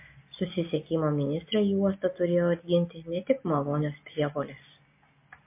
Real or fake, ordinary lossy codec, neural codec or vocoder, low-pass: real; MP3, 24 kbps; none; 3.6 kHz